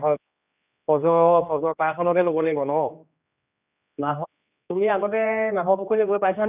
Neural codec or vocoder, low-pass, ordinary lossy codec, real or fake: codec, 16 kHz, 2 kbps, X-Codec, HuBERT features, trained on general audio; 3.6 kHz; none; fake